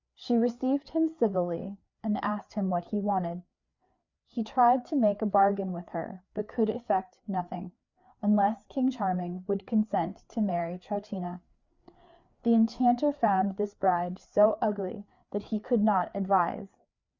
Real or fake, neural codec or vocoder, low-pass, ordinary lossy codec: fake; codec, 16 kHz, 4 kbps, FreqCodec, larger model; 7.2 kHz; Opus, 64 kbps